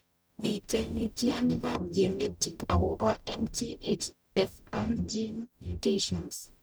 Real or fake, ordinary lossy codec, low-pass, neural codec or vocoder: fake; none; none; codec, 44.1 kHz, 0.9 kbps, DAC